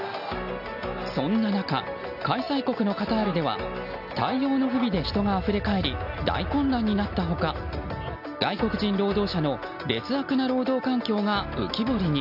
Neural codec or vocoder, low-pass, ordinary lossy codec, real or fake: none; 5.4 kHz; none; real